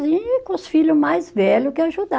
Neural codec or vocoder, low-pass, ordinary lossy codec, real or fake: none; none; none; real